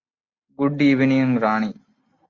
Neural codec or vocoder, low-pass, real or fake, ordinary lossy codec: none; 7.2 kHz; real; Opus, 64 kbps